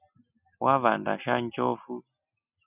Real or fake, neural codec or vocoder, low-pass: real; none; 3.6 kHz